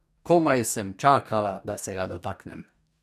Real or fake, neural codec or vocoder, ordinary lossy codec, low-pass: fake; codec, 44.1 kHz, 2.6 kbps, DAC; none; 14.4 kHz